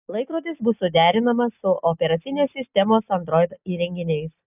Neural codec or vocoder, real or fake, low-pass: none; real; 3.6 kHz